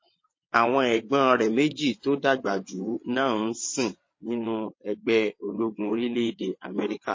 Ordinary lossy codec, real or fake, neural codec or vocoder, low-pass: MP3, 32 kbps; fake; vocoder, 22.05 kHz, 80 mel bands, WaveNeXt; 7.2 kHz